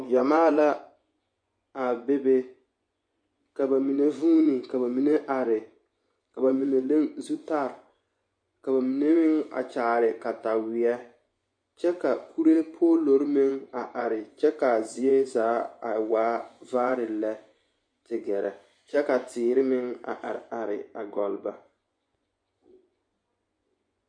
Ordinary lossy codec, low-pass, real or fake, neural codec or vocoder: MP3, 48 kbps; 9.9 kHz; fake; vocoder, 24 kHz, 100 mel bands, Vocos